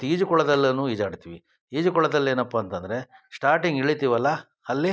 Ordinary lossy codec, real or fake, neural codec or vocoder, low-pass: none; real; none; none